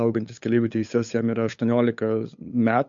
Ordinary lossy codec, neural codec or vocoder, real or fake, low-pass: MP3, 48 kbps; codec, 16 kHz, 8 kbps, FunCodec, trained on LibriTTS, 25 frames a second; fake; 7.2 kHz